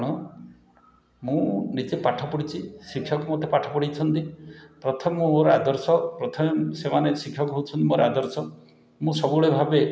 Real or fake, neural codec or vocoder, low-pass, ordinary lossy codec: real; none; none; none